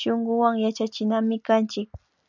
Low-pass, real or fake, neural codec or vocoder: 7.2 kHz; real; none